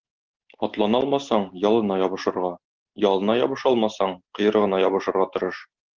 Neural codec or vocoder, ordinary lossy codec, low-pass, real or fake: none; Opus, 16 kbps; 7.2 kHz; real